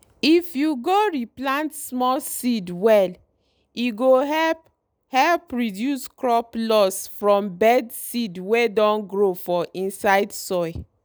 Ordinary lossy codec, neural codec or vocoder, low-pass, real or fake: none; none; none; real